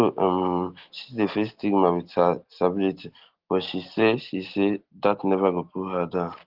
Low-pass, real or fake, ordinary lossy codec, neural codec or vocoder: 5.4 kHz; real; Opus, 16 kbps; none